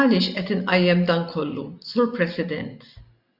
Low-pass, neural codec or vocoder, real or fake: 5.4 kHz; none; real